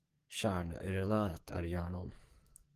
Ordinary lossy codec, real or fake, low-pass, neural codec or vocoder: Opus, 24 kbps; fake; 14.4 kHz; codec, 44.1 kHz, 2.6 kbps, SNAC